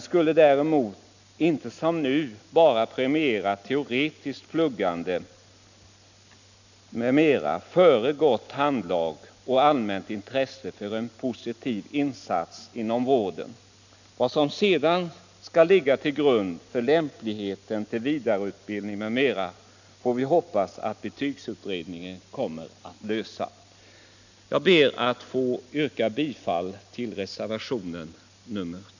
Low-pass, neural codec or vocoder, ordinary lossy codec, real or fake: 7.2 kHz; none; none; real